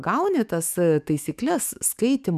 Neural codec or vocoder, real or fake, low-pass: autoencoder, 48 kHz, 128 numbers a frame, DAC-VAE, trained on Japanese speech; fake; 14.4 kHz